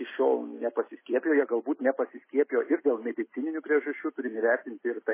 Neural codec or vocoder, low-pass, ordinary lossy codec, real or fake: none; 3.6 kHz; MP3, 16 kbps; real